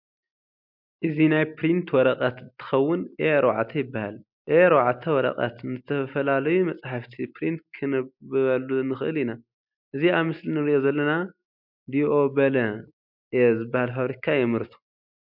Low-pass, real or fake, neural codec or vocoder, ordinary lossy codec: 5.4 kHz; real; none; MP3, 48 kbps